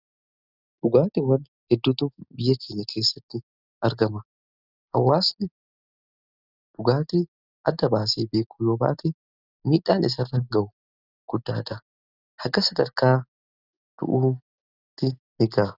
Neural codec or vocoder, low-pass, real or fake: none; 5.4 kHz; real